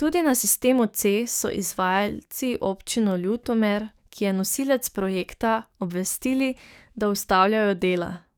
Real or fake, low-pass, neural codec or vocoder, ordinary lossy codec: fake; none; codec, 44.1 kHz, 7.8 kbps, DAC; none